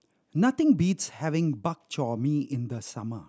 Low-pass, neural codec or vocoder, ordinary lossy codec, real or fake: none; none; none; real